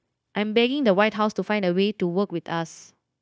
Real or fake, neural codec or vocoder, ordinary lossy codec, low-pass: fake; codec, 16 kHz, 0.9 kbps, LongCat-Audio-Codec; none; none